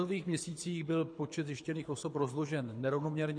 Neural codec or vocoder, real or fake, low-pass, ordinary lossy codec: codec, 24 kHz, 6 kbps, HILCodec; fake; 9.9 kHz; MP3, 48 kbps